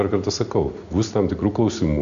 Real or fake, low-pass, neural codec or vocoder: real; 7.2 kHz; none